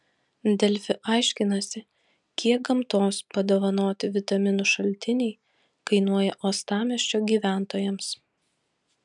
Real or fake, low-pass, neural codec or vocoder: real; 10.8 kHz; none